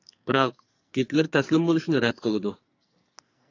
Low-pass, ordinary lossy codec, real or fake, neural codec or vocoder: 7.2 kHz; AAC, 48 kbps; fake; codec, 44.1 kHz, 2.6 kbps, SNAC